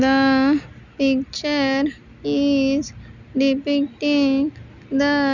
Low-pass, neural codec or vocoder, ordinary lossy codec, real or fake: 7.2 kHz; none; none; real